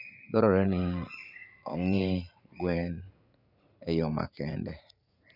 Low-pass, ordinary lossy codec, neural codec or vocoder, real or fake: 5.4 kHz; none; vocoder, 44.1 kHz, 80 mel bands, Vocos; fake